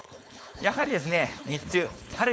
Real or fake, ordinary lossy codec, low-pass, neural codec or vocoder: fake; none; none; codec, 16 kHz, 4.8 kbps, FACodec